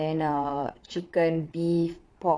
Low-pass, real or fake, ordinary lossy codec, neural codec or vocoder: none; fake; none; vocoder, 22.05 kHz, 80 mel bands, Vocos